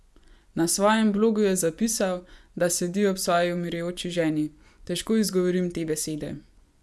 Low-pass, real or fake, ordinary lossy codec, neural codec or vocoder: none; fake; none; vocoder, 24 kHz, 100 mel bands, Vocos